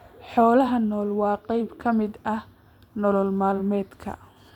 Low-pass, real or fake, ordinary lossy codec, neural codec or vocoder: 19.8 kHz; fake; none; vocoder, 44.1 kHz, 128 mel bands every 512 samples, BigVGAN v2